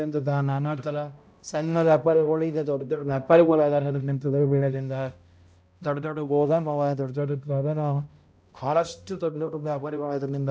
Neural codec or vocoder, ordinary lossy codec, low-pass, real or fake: codec, 16 kHz, 0.5 kbps, X-Codec, HuBERT features, trained on balanced general audio; none; none; fake